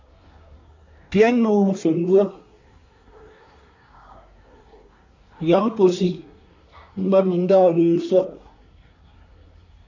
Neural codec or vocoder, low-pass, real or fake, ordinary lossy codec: codec, 24 kHz, 1 kbps, SNAC; 7.2 kHz; fake; MP3, 64 kbps